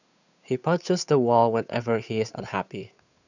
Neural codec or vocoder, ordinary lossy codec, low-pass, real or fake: codec, 16 kHz, 8 kbps, FunCodec, trained on Chinese and English, 25 frames a second; none; 7.2 kHz; fake